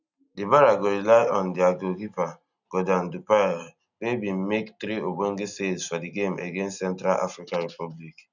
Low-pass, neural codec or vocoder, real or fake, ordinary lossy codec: 7.2 kHz; none; real; none